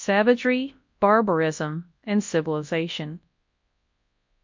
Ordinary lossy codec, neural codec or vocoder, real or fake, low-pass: MP3, 48 kbps; codec, 24 kHz, 0.9 kbps, WavTokenizer, large speech release; fake; 7.2 kHz